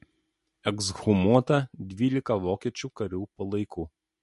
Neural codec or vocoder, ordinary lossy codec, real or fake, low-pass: none; MP3, 48 kbps; real; 14.4 kHz